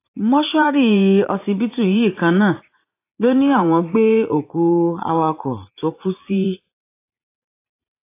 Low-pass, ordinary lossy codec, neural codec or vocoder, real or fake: 3.6 kHz; AAC, 24 kbps; vocoder, 44.1 kHz, 80 mel bands, Vocos; fake